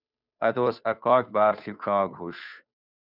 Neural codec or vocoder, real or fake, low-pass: codec, 16 kHz, 2 kbps, FunCodec, trained on Chinese and English, 25 frames a second; fake; 5.4 kHz